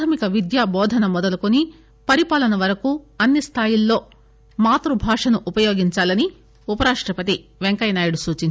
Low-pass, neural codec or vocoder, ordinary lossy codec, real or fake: none; none; none; real